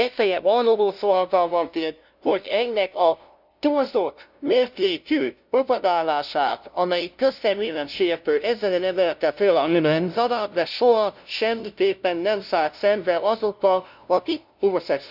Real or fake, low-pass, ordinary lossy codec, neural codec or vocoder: fake; 5.4 kHz; none; codec, 16 kHz, 0.5 kbps, FunCodec, trained on LibriTTS, 25 frames a second